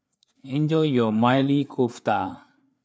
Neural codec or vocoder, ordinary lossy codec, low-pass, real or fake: codec, 16 kHz, 4 kbps, FreqCodec, larger model; none; none; fake